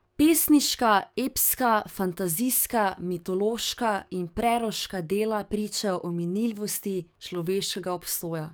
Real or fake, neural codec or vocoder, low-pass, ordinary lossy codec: fake; codec, 44.1 kHz, 7.8 kbps, DAC; none; none